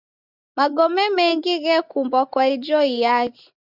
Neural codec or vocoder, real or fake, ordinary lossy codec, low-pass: none; real; Opus, 64 kbps; 5.4 kHz